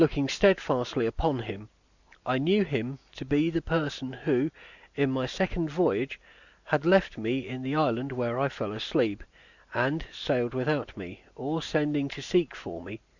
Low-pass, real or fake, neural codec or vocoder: 7.2 kHz; real; none